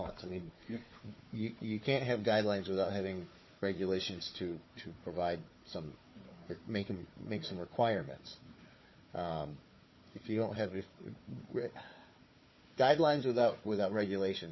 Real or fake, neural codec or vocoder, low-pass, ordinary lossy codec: fake; codec, 16 kHz, 4 kbps, FunCodec, trained on Chinese and English, 50 frames a second; 7.2 kHz; MP3, 24 kbps